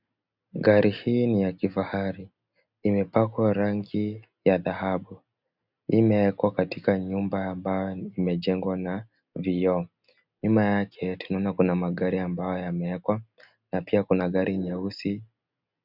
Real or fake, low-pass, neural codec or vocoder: real; 5.4 kHz; none